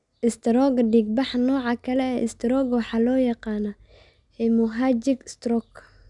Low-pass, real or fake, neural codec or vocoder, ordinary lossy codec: 10.8 kHz; real; none; none